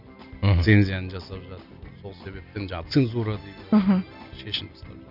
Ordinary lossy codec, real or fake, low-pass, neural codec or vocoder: none; real; 5.4 kHz; none